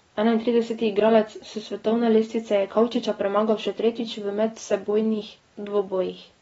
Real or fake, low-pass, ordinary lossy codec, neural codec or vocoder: real; 19.8 kHz; AAC, 24 kbps; none